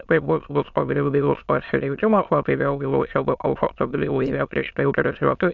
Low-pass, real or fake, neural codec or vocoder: 7.2 kHz; fake; autoencoder, 22.05 kHz, a latent of 192 numbers a frame, VITS, trained on many speakers